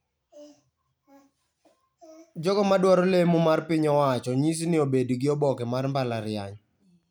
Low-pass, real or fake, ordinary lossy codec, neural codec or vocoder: none; real; none; none